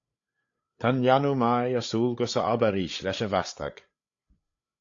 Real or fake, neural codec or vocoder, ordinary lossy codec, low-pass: fake; codec, 16 kHz, 8 kbps, FreqCodec, larger model; AAC, 48 kbps; 7.2 kHz